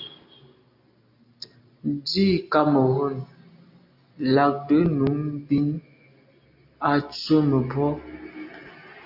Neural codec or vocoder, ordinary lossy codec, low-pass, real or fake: none; AAC, 48 kbps; 5.4 kHz; real